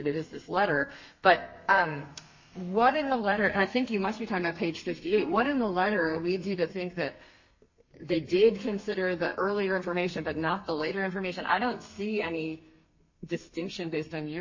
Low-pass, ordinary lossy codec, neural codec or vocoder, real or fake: 7.2 kHz; MP3, 32 kbps; codec, 32 kHz, 1.9 kbps, SNAC; fake